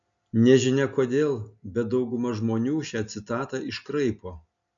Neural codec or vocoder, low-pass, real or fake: none; 7.2 kHz; real